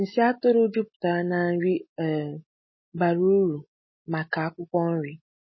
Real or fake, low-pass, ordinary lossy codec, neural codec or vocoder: real; 7.2 kHz; MP3, 24 kbps; none